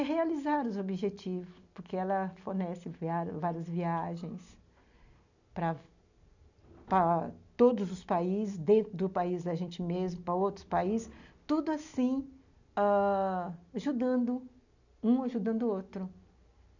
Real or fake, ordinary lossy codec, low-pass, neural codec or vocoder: real; none; 7.2 kHz; none